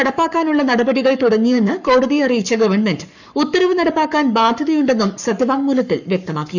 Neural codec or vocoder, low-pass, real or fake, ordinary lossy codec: codec, 44.1 kHz, 7.8 kbps, Pupu-Codec; 7.2 kHz; fake; none